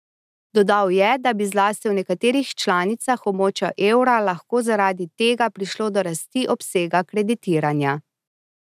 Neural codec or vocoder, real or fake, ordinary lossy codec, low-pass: none; real; none; 14.4 kHz